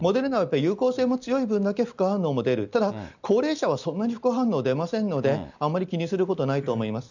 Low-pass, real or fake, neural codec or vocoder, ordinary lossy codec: 7.2 kHz; real; none; none